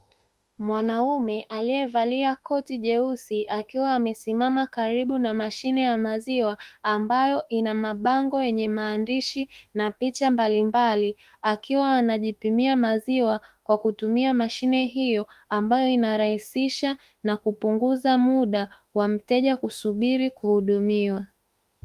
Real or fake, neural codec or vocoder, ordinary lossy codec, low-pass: fake; autoencoder, 48 kHz, 32 numbers a frame, DAC-VAE, trained on Japanese speech; Opus, 32 kbps; 14.4 kHz